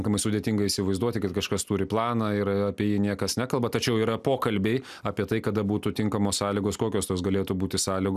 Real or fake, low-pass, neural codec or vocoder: real; 14.4 kHz; none